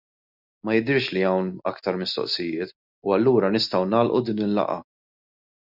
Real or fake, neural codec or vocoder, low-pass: real; none; 5.4 kHz